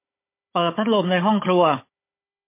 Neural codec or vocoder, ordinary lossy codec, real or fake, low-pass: codec, 16 kHz, 16 kbps, FunCodec, trained on Chinese and English, 50 frames a second; MP3, 24 kbps; fake; 3.6 kHz